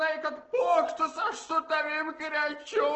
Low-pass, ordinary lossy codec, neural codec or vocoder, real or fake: 7.2 kHz; Opus, 16 kbps; none; real